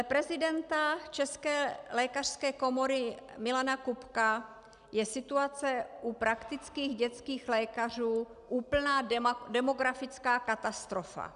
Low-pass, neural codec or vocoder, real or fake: 10.8 kHz; none; real